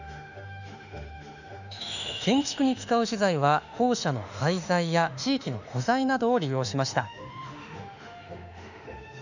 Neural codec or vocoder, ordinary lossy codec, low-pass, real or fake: autoencoder, 48 kHz, 32 numbers a frame, DAC-VAE, trained on Japanese speech; none; 7.2 kHz; fake